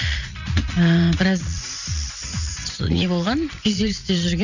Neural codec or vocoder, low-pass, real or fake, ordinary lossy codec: vocoder, 44.1 kHz, 80 mel bands, Vocos; 7.2 kHz; fake; none